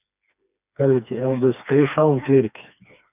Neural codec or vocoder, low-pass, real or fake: codec, 16 kHz, 2 kbps, FreqCodec, smaller model; 3.6 kHz; fake